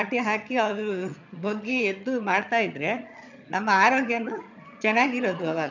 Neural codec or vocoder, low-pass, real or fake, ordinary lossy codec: vocoder, 22.05 kHz, 80 mel bands, HiFi-GAN; 7.2 kHz; fake; none